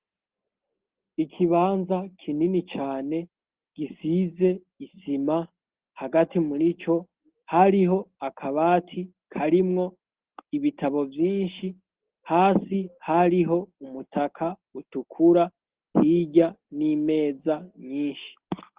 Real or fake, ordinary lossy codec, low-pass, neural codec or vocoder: real; Opus, 16 kbps; 3.6 kHz; none